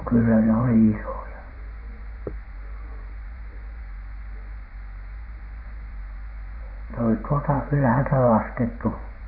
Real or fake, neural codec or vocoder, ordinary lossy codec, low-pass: fake; vocoder, 24 kHz, 100 mel bands, Vocos; none; 5.4 kHz